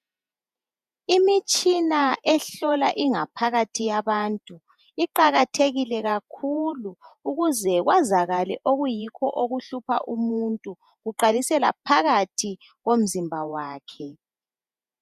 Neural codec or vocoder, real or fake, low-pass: vocoder, 48 kHz, 128 mel bands, Vocos; fake; 14.4 kHz